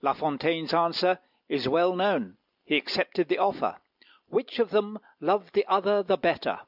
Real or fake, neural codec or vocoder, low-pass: real; none; 5.4 kHz